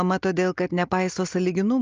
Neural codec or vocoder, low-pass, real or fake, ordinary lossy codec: none; 7.2 kHz; real; Opus, 24 kbps